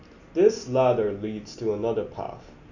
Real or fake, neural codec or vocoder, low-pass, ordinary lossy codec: real; none; 7.2 kHz; none